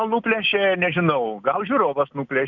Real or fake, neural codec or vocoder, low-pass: real; none; 7.2 kHz